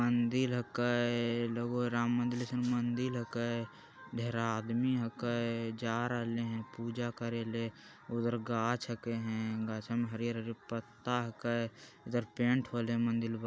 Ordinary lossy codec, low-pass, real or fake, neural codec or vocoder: none; none; real; none